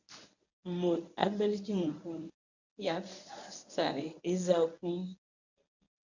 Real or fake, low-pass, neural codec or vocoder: fake; 7.2 kHz; codec, 24 kHz, 0.9 kbps, WavTokenizer, medium speech release version 1